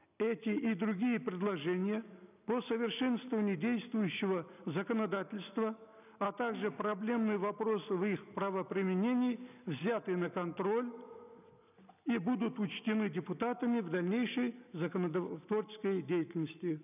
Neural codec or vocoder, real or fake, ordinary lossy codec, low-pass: none; real; none; 3.6 kHz